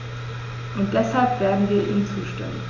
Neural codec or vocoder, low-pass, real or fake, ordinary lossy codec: none; 7.2 kHz; real; none